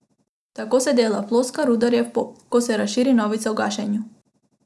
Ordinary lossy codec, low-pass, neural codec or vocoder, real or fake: none; none; none; real